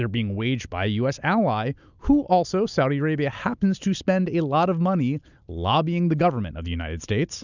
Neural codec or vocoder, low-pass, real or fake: none; 7.2 kHz; real